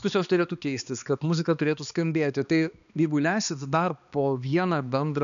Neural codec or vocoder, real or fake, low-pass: codec, 16 kHz, 2 kbps, X-Codec, HuBERT features, trained on balanced general audio; fake; 7.2 kHz